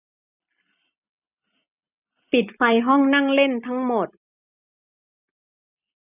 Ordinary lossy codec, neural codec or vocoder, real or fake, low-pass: none; none; real; 3.6 kHz